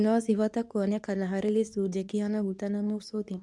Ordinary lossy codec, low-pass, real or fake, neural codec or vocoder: none; none; fake; codec, 24 kHz, 0.9 kbps, WavTokenizer, medium speech release version 2